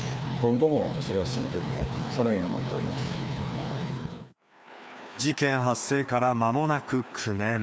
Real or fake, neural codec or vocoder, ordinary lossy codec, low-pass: fake; codec, 16 kHz, 2 kbps, FreqCodec, larger model; none; none